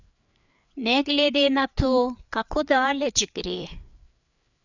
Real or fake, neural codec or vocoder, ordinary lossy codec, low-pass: fake; codec, 16 kHz, 4 kbps, FreqCodec, larger model; none; 7.2 kHz